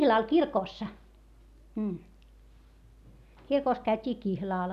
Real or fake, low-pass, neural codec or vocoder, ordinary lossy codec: real; 14.4 kHz; none; none